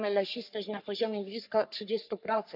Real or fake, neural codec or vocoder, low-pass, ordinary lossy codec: fake; codec, 44.1 kHz, 3.4 kbps, Pupu-Codec; 5.4 kHz; none